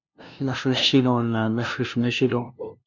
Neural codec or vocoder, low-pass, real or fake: codec, 16 kHz, 0.5 kbps, FunCodec, trained on LibriTTS, 25 frames a second; 7.2 kHz; fake